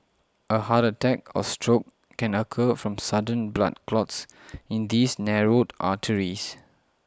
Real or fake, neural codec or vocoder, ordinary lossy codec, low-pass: real; none; none; none